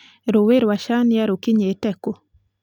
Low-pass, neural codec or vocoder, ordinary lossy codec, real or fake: 19.8 kHz; none; none; real